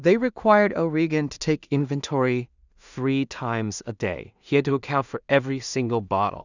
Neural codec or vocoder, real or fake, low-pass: codec, 16 kHz in and 24 kHz out, 0.4 kbps, LongCat-Audio-Codec, two codebook decoder; fake; 7.2 kHz